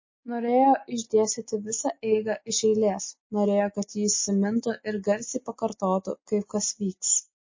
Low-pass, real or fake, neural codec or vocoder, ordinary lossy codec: 7.2 kHz; real; none; MP3, 32 kbps